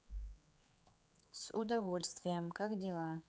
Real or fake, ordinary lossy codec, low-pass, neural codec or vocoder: fake; none; none; codec, 16 kHz, 4 kbps, X-Codec, HuBERT features, trained on general audio